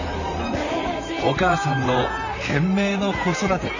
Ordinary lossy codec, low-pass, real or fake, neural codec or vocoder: none; 7.2 kHz; fake; vocoder, 44.1 kHz, 128 mel bands, Pupu-Vocoder